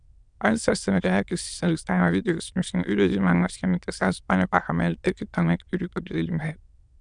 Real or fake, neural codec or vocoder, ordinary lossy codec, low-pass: fake; autoencoder, 22.05 kHz, a latent of 192 numbers a frame, VITS, trained on many speakers; Opus, 64 kbps; 9.9 kHz